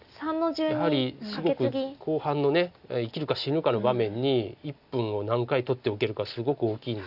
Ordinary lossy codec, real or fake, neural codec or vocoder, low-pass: none; real; none; 5.4 kHz